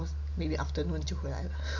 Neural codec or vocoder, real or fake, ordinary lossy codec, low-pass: none; real; none; 7.2 kHz